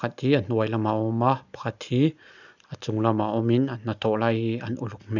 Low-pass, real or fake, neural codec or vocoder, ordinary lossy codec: 7.2 kHz; real; none; none